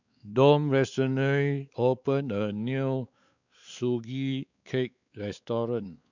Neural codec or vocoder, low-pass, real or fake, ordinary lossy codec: codec, 16 kHz, 4 kbps, X-Codec, WavLM features, trained on Multilingual LibriSpeech; 7.2 kHz; fake; none